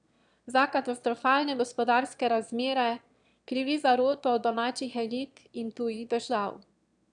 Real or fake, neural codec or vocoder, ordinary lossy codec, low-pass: fake; autoencoder, 22.05 kHz, a latent of 192 numbers a frame, VITS, trained on one speaker; none; 9.9 kHz